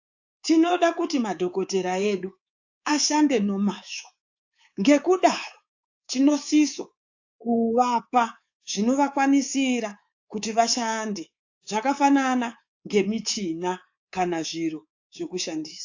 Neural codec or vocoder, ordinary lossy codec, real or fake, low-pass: codec, 24 kHz, 3.1 kbps, DualCodec; AAC, 48 kbps; fake; 7.2 kHz